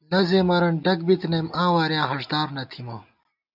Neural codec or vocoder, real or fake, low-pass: none; real; 5.4 kHz